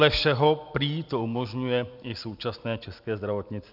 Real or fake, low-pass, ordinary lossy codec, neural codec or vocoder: real; 5.4 kHz; AAC, 48 kbps; none